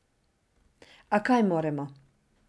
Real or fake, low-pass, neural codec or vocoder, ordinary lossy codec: real; none; none; none